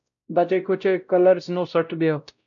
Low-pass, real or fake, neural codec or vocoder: 7.2 kHz; fake; codec, 16 kHz, 0.5 kbps, X-Codec, WavLM features, trained on Multilingual LibriSpeech